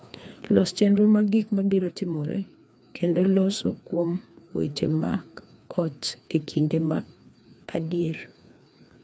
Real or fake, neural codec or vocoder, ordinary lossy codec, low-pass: fake; codec, 16 kHz, 2 kbps, FreqCodec, larger model; none; none